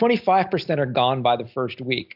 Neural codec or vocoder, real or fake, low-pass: none; real; 5.4 kHz